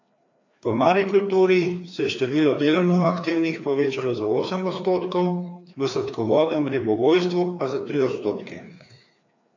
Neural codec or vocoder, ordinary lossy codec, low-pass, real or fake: codec, 16 kHz, 2 kbps, FreqCodec, larger model; AAC, 48 kbps; 7.2 kHz; fake